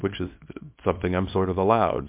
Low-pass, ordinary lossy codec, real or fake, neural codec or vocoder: 3.6 kHz; MP3, 32 kbps; fake; codec, 24 kHz, 0.9 kbps, WavTokenizer, small release